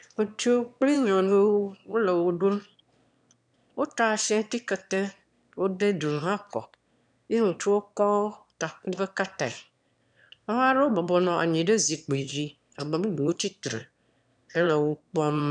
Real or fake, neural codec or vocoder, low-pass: fake; autoencoder, 22.05 kHz, a latent of 192 numbers a frame, VITS, trained on one speaker; 9.9 kHz